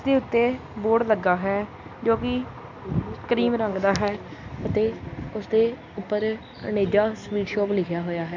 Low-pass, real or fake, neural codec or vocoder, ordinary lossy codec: 7.2 kHz; real; none; MP3, 64 kbps